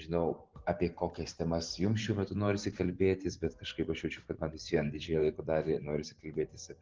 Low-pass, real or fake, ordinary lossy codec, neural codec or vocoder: 7.2 kHz; fake; Opus, 16 kbps; vocoder, 44.1 kHz, 128 mel bands every 512 samples, BigVGAN v2